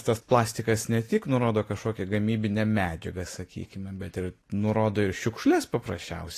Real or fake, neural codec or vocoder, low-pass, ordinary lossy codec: real; none; 14.4 kHz; AAC, 48 kbps